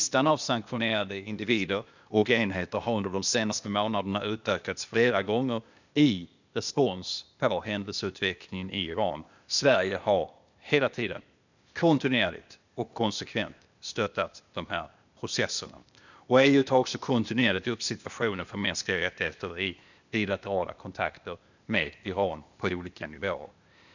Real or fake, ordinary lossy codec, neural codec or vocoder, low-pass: fake; none; codec, 16 kHz, 0.8 kbps, ZipCodec; 7.2 kHz